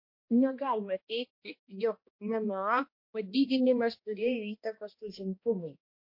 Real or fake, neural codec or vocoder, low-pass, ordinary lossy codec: fake; codec, 16 kHz, 1 kbps, X-Codec, HuBERT features, trained on balanced general audio; 5.4 kHz; MP3, 32 kbps